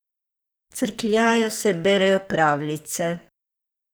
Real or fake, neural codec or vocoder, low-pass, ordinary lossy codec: fake; codec, 44.1 kHz, 2.6 kbps, SNAC; none; none